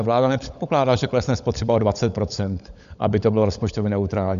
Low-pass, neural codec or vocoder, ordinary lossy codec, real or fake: 7.2 kHz; codec, 16 kHz, 16 kbps, FunCodec, trained on LibriTTS, 50 frames a second; AAC, 96 kbps; fake